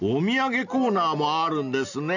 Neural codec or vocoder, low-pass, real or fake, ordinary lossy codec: none; 7.2 kHz; real; none